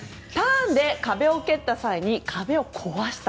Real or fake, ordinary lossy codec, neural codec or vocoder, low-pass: real; none; none; none